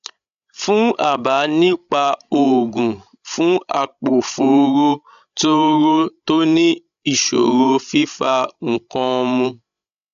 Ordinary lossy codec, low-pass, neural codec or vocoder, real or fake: none; 7.2 kHz; codec, 16 kHz, 16 kbps, FreqCodec, larger model; fake